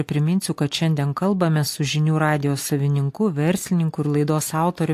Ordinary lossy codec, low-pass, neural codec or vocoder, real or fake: AAC, 64 kbps; 14.4 kHz; none; real